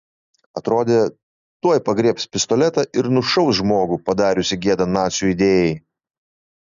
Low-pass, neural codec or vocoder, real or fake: 7.2 kHz; none; real